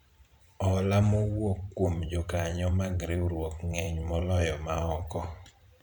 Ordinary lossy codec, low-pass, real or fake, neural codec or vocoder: none; 19.8 kHz; fake; vocoder, 44.1 kHz, 128 mel bands every 512 samples, BigVGAN v2